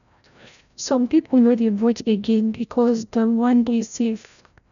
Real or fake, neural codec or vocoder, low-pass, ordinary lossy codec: fake; codec, 16 kHz, 0.5 kbps, FreqCodec, larger model; 7.2 kHz; none